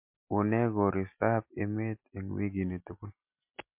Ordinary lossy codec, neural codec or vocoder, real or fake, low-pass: MP3, 32 kbps; none; real; 3.6 kHz